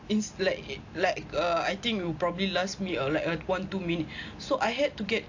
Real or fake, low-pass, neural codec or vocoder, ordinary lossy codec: real; 7.2 kHz; none; AAC, 48 kbps